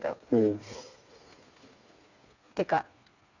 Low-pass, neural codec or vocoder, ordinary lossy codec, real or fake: 7.2 kHz; codec, 16 kHz, 4 kbps, FreqCodec, smaller model; none; fake